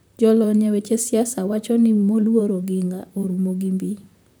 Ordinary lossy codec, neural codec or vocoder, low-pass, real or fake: none; vocoder, 44.1 kHz, 128 mel bands, Pupu-Vocoder; none; fake